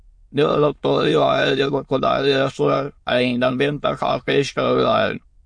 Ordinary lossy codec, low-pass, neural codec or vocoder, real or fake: MP3, 48 kbps; 9.9 kHz; autoencoder, 22.05 kHz, a latent of 192 numbers a frame, VITS, trained on many speakers; fake